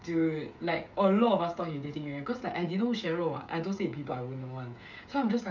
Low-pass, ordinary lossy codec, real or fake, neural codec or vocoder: 7.2 kHz; none; fake; codec, 16 kHz, 16 kbps, FreqCodec, smaller model